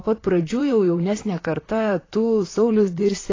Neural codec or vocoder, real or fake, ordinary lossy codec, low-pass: vocoder, 44.1 kHz, 128 mel bands, Pupu-Vocoder; fake; AAC, 32 kbps; 7.2 kHz